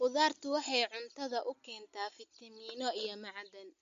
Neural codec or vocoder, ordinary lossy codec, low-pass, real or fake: none; MP3, 48 kbps; 7.2 kHz; real